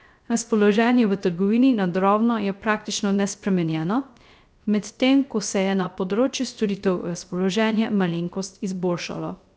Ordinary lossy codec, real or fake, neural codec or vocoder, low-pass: none; fake; codec, 16 kHz, 0.3 kbps, FocalCodec; none